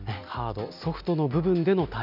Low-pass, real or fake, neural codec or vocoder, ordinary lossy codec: 5.4 kHz; real; none; none